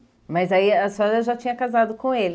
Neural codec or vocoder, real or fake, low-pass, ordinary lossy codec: none; real; none; none